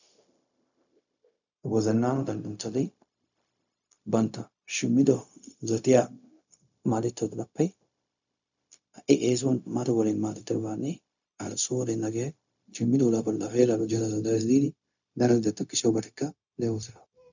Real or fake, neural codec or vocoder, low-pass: fake; codec, 16 kHz, 0.4 kbps, LongCat-Audio-Codec; 7.2 kHz